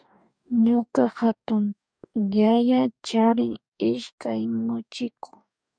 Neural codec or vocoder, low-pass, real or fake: codec, 44.1 kHz, 2.6 kbps, DAC; 9.9 kHz; fake